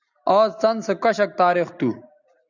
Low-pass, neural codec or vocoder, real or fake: 7.2 kHz; none; real